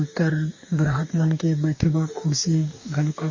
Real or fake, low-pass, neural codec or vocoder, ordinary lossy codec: fake; 7.2 kHz; codec, 44.1 kHz, 2.6 kbps, DAC; MP3, 32 kbps